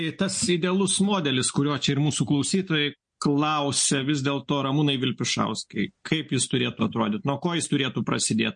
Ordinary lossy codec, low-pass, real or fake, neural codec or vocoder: MP3, 48 kbps; 9.9 kHz; real; none